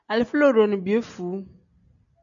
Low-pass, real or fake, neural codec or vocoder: 7.2 kHz; real; none